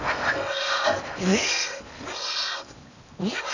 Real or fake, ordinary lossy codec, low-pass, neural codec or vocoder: fake; none; 7.2 kHz; codec, 16 kHz in and 24 kHz out, 0.8 kbps, FocalCodec, streaming, 65536 codes